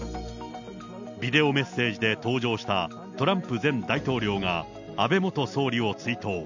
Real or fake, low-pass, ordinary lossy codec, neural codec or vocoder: real; 7.2 kHz; none; none